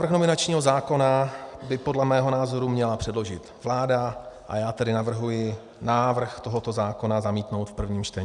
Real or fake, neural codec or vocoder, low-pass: real; none; 10.8 kHz